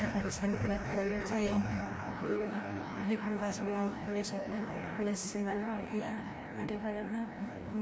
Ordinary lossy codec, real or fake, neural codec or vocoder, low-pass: none; fake; codec, 16 kHz, 1 kbps, FreqCodec, larger model; none